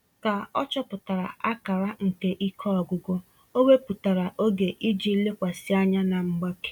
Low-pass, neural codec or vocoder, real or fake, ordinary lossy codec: 19.8 kHz; none; real; none